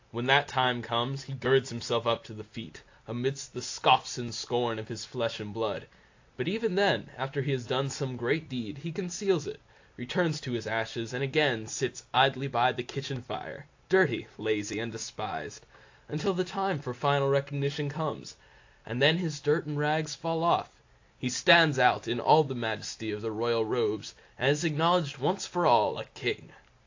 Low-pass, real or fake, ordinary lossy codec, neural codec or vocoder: 7.2 kHz; real; AAC, 48 kbps; none